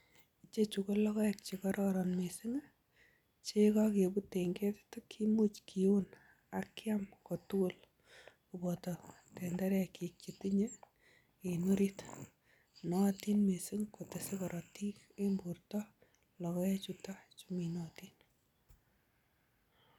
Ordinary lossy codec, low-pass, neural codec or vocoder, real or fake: none; 19.8 kHz; none; real